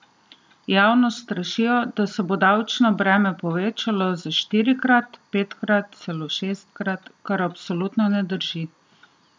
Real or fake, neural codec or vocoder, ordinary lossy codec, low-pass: real; none; none; none